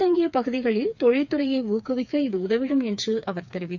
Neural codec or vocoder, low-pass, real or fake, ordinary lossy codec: codec, 16 kHz, 4 kbps, FreqCodec, smaller model; 7.2 kHz; fake; none